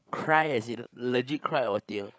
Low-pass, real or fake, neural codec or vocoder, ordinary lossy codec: none; fake; codec, 16 kHz, 8 kbps, FreqCodec, larger model; none